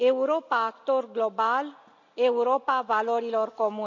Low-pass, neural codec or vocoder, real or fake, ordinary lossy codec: 7.2 kHz; none; real; MP3, 64 kbps